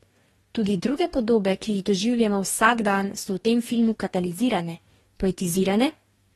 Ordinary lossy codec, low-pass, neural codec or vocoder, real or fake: AAC, 32 kbps; 19.8 kHz; codec, 44.1 kHz, 2.6 kbps, DAC; fake